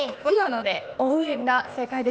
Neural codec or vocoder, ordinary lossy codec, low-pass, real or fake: codec, 16 kHz, 0.8 kbps, ZipCodec; none; none; fake